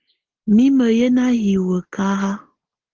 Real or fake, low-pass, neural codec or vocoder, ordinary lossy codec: real; 7.2 kHz; none; Opus, 16 kbps